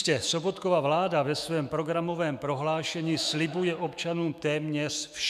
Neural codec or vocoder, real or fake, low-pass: autoencoder, 48 kHz, 128 numbers a frame, DAC-VAE, trained on Japanese speech; fake; 14.4 kHz